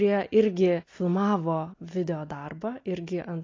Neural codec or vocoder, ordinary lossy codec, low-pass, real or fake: none; AAC, 32 kbps; 7.2 kHz; real